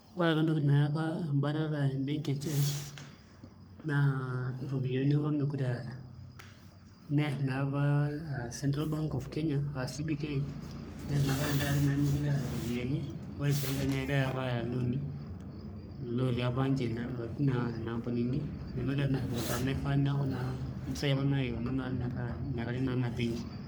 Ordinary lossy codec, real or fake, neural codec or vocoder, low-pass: none; fake; codec, 44.1 kHz, 3.4 kbps, Pupu-Codec; none